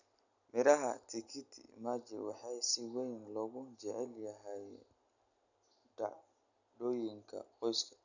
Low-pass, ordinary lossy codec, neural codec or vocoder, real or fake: 7.2 kHz; none; none; real